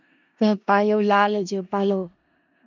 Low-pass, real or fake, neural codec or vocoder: 7.2 kHz; fake; codec, 16 kHz in and 24 kHz out, 0.4 kbps, LongCat-Audio-Codec, four codebook decoder